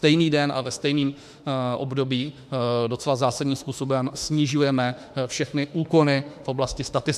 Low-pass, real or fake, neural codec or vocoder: 14.4 kHz; fake; autoencoder, 48 kHz, 32 numbers a frame, DAC-VAE, trained on Japanese speech